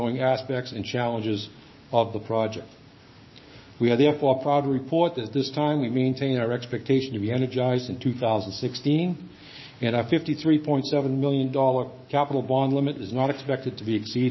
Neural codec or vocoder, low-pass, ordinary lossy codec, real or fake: codec, 16 kHz, 6 kbps, DAC; 7.2 kHz; MP3, 24 kbps; fake